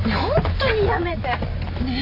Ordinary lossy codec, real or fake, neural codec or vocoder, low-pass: none; real; none; 5.4 kHz